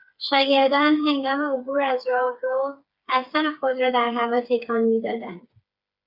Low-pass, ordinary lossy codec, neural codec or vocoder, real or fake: 5.4 kHz; Opus, 64 kbps; codec, 16 kHz, 4 kbps, FreqCodec, smaller model; fake